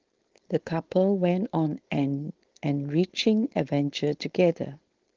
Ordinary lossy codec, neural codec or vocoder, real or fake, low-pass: Opus, 16 kbps; codec, 16 kHz, 4.8 kbps, FACodec; fake; 7.2 kHz